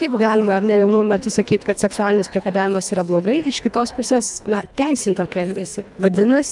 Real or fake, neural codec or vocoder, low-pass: fake; codec, 24 kHz, 1.5 kbps, HILCodec; 10.8 kHz